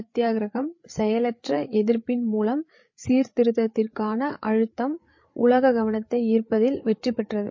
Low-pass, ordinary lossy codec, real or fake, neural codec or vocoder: 7.2 kHz; MP3, 32 kbps; fake; codec, 16 kHz, 8 kbps, FreqCodec, larger model